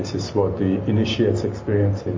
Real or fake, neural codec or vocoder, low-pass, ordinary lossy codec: real; none; 7.2 kHz; MP3, 32 kbps